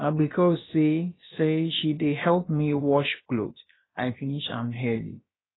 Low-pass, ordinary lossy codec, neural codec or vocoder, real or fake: 7.2 kHz; AAC, 16 kbps; codec, 16 kHz, about 1 kbps, DyCAST, with the encoder's durations; fake